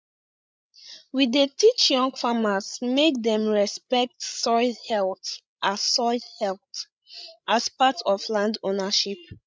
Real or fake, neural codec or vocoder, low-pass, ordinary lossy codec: fake; codec, 16 kHz, 16 kbps, FreqCodec, larger model; none; none